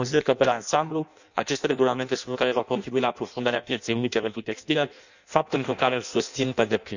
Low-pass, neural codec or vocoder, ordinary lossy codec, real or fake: 7.2 kHz; codec, 16 kHz in and 24 kHz out, 0.6 kbps, FireRedTTS-2 codec; none; fake